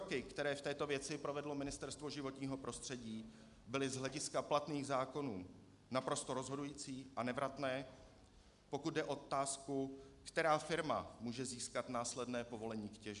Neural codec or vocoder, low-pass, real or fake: none; 10.8 kHz; real